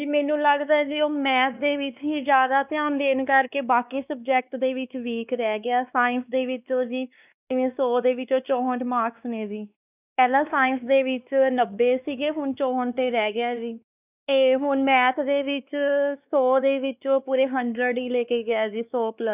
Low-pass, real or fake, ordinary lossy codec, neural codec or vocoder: 3.6 kHz; fake; none; codec, 16 kHz, 2 kbps, X-Codec, WavLM features, trained on Multilingual LibriSpeech